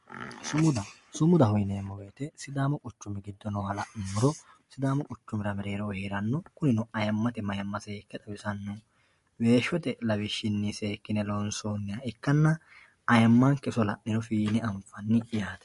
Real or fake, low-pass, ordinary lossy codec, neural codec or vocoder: real; 14.4 kHz; MP3, 48 kbps; none